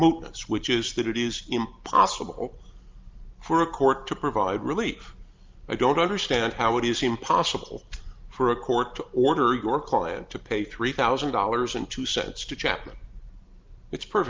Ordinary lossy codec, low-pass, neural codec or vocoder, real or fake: Opus, 24 kbps; 7.2 kHz; none; real